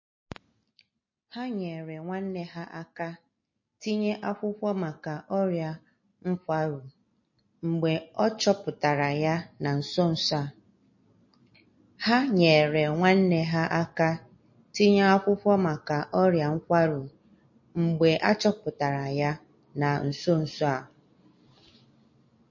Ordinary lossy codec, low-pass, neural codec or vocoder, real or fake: MP3, 32 kbps; 7.2 kHz; none; real